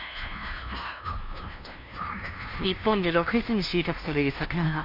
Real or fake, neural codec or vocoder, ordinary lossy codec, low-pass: fake; codec, 16 kHz, 0.5 kbps, FunCodec, trained on LibriTTS, 25 frames a second; MP3, 48 kbps; 5.4 kHz